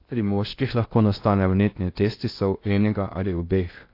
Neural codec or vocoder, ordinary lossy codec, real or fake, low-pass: codec, 16 kHz in and 24 kHz out, 0.6 kbps, FocalCodec, streaming, 2048 codes; AAC, 32 kbps; fake; 5.4 kHz